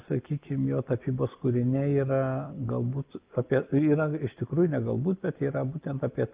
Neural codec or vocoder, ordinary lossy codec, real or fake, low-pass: none; Opus, 24 kbps; real; 3.6 kHz